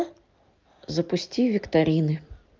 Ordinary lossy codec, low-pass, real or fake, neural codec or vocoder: Opus, 24 kbps; 7.2 kHz; real; none